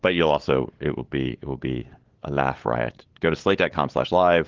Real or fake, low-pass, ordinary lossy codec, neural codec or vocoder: fake; 7.2 kHz; Opus, 16 kbps; codec, 16 kHz, 8 kbps, FunCodec, trained on LibriTTS, 25 frames a second